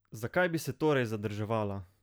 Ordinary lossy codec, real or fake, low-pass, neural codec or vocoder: none; real; none; none